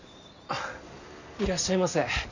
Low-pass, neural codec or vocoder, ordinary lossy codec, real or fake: 7.2 kHz; none; none; real